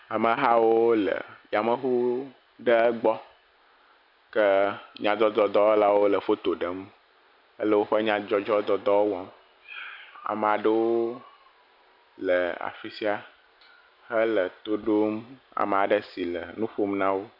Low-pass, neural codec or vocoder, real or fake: 5.4 kHz; none; real